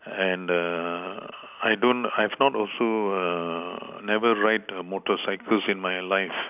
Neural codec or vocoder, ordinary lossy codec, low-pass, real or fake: none; none; 3.6 kHz; real